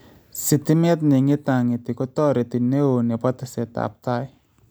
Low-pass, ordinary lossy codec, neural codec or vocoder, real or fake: none; none; none; real